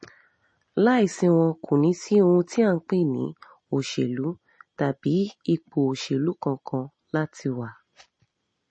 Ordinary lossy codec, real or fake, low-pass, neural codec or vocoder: MP3, 32 kbps; real; 9.9 kHz; none